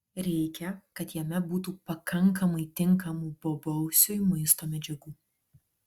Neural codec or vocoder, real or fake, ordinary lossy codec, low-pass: none; real; Opus, 64 kbps; 19.8 kHz